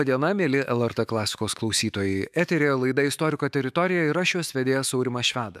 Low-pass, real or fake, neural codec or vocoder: 14.4 kHz; fake; autoencoder, 48 kHz, 128 numbers a frame, DAC-VAE, trained on Japanese speech